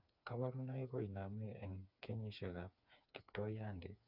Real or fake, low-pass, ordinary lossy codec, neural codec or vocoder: fake; 5.4 kHz; none; codec, 16 kHz, 4 kbps, FreqCodec, smaller model